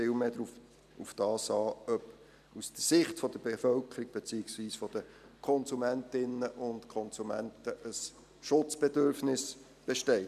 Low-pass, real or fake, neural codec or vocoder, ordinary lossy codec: 14.4 kHz; real; none; none